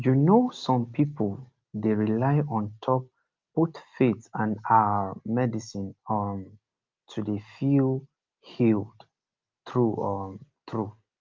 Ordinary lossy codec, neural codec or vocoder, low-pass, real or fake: Opus, 24 kbps; none; 7.2 kHz; real